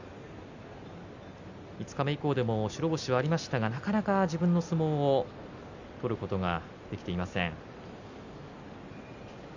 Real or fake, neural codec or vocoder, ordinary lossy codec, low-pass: real; none; none; 7.2 kHz